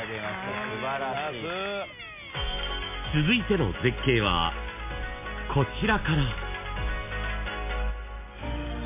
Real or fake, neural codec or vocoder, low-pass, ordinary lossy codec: real; none; 3.6 kHz; MP3, 24 kbps